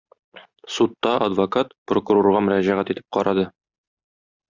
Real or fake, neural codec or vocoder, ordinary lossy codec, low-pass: real; none; Opus, 64 kbps; 7.2 kHz